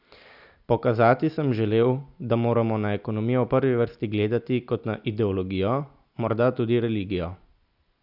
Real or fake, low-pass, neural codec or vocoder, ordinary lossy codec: real; 5.4 kHz; none; none